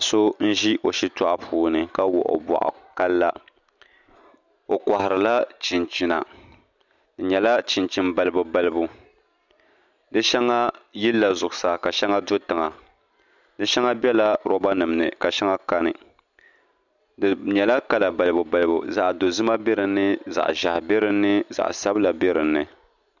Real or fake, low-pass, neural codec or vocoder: real; 7.2 kHz; none